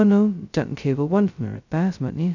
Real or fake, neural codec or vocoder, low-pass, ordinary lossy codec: fake; codec, 16 kHz, 0.2 kbps, FocalCodec; 7.2 kHz; none